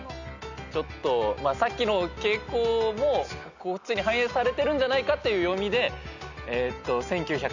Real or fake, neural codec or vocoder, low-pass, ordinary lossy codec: real; none; 7.2 kHz; none